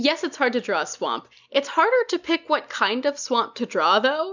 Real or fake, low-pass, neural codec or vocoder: real; 7.2 kHz; none